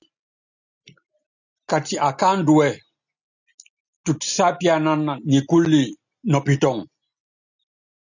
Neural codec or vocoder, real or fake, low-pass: none; real; 7.2 kHz